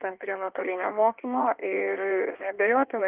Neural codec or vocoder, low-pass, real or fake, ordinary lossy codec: codec, 16 kHz in and 24 kHz out, 1.1 kbps, FireRedTTS-2 codec; 3.6 kHz; fake; Opus, 32 kbps